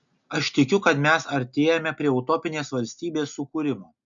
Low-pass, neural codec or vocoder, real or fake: 7.2 kHz; none; real